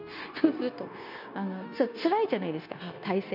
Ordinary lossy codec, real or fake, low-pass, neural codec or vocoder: none; fake; 5.4 kHz; codec, 16 kHz, 0.9 kbps, LongCat-Audio-Codec